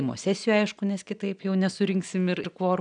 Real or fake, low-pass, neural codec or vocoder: real; 9.9 kHz; none